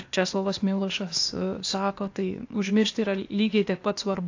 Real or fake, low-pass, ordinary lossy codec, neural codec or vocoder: fake; 7.2 kHz; AAC, 48 kbps; codec, 16 kHz, 0.8 kbps, ZipCodec